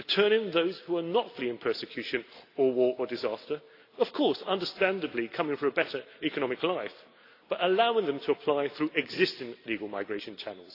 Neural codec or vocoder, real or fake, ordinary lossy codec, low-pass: none; real; AAC, 32 kbps; 5.4 kHz